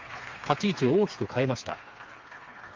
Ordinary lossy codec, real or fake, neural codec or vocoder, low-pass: Opus, 32 kbps; fake; codec, 16 kHz, 4 kbps, FreqCodec, smaller model; 7.2 kHz